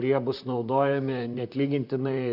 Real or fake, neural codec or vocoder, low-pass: fake; vocoder, 44.1 kHz, 128 mel bands, Pupu-Vocoder; 5.4 kHz